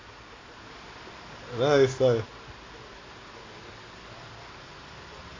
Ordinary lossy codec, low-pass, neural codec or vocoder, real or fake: AAC, 32 kbps; 7.2 kHz; none; real